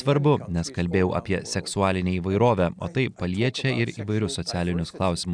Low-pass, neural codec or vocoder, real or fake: 9.9 kHz; none; real